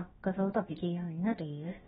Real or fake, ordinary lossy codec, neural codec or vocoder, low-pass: fake; AAC, 16 kbps; codec, 16 kHz, about 1 kbps, DyCAST, with the encoder's durations; 7.2 kHz